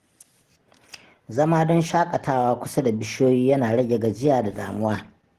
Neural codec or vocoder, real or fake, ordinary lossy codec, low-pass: none; real; Opus, 16 kbps; 14.4 kHz